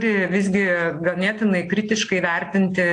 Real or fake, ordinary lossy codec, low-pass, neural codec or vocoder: real; AAC, 48 kbps; 9.9 kHz; none